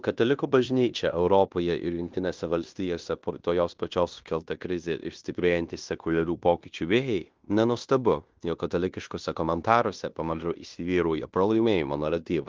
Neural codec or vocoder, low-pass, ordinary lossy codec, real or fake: codec, 16 kHz in and 24 kHz out, 0.9 kbps, LongCat-Audio-Codec, fine tuned four codebook decoder; 7.2 kHz; Opus, 32 kbps; fake